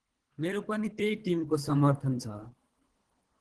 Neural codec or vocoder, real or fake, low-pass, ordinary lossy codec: codec, 24 kHz, 3 kbps, HILCodec; fake; 10.8 kHz; Opus, 16 kbps